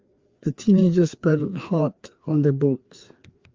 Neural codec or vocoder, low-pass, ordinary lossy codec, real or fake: codec, 16 kHz, 2 kbps, FreqCodec, larger model; 7.2 kHz; Opus, 32 kbps; fake